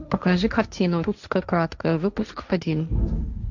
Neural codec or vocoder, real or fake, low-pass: codec, 16 kHz, 1.1 kbps, Voila-Tokenizer; fake; 7.2 kHz